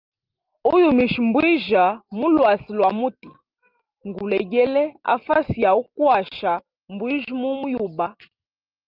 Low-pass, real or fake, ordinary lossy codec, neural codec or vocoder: 5.4 kHz; real; Opus, 24 kbps; none